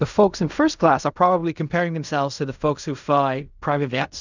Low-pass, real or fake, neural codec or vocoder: 7.2 kHz; fake; codec, 16 kHz in and 24 kHz out, 0.4 kbps, LongCat-Audio-Codec, fine tuned four codebook decoder